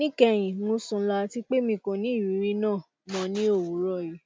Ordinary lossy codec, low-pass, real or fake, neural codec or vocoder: none; none; real; none